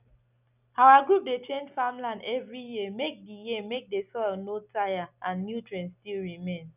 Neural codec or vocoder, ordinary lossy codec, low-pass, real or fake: none; none; 3.6 kHz; real